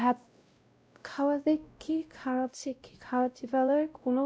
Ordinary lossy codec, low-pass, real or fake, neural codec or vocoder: none; none; fake; codec, 16 kHz, 0.5 kbps, X-Codec, WavLM features, trained on Multilingual LibriSpeech